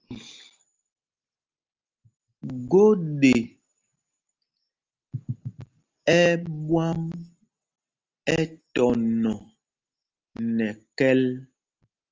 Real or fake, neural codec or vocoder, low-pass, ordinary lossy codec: real; none; 7.2 kHz; Opus, 32 kbps